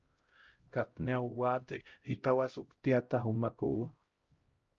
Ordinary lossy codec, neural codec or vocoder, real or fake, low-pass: Opus, 24 kbps; codec, 16 kHz, 0.5 kbps, X-Codec, HuBERT features, trained on LibriSpeech; fake; 7.2 kHz